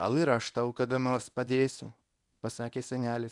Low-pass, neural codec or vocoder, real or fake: 10.8 kHz; codec, 24 kHz, 0.9 kbps, WavTokenizer, medium speech release version 1; fake